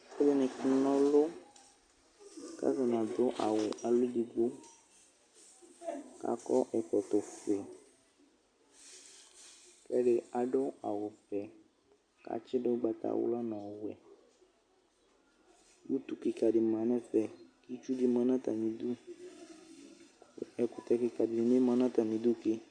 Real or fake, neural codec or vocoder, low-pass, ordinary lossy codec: real; none; 9.9 kHz; Opus, 64 kbps